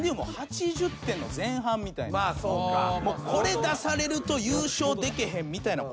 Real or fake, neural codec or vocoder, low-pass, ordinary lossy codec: real; none; none; none